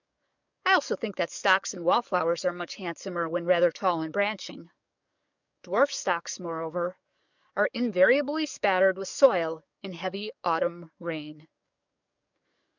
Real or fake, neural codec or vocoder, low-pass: fake; codec, 44.1 kHz, 7.8 kbps, DAC; 7.2 kHz